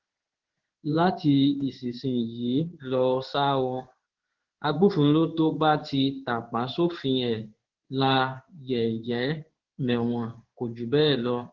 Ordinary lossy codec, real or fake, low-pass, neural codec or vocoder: Opus, 16 kbps; fake; 7.2 kHz; codec, 16 kHz in and 24 kHz out, 1 kbps, XY-Tokenizer